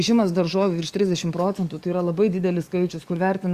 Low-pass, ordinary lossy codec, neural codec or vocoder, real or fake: 14.4 kHz; Opus, 64 kbps; codec, 44.1 kHz, 7.8 kbps, DAC; fake